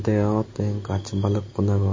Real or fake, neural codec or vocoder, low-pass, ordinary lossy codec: real; none; 7.2 kHz; MP3, 32 kbps